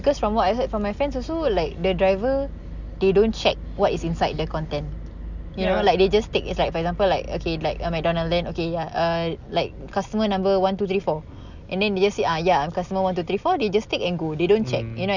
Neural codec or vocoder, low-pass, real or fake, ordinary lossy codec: none; 7.2 kHz; real; none